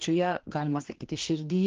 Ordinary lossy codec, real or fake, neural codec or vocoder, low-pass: Opus, 16 kbps; fake; codec, 16 kHz, 2 kbps, X-Codec, HuBERT features, trained on general audio; 7.2 kHz